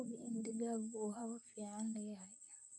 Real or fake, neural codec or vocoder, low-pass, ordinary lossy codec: fake; vocoder, 44.1 kHz, 128 mel bands, Pupu-Vocoder; 10.8 kHz; none